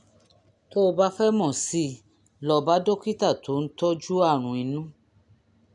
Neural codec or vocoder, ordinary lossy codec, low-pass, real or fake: none; none; 10.8 kHz; real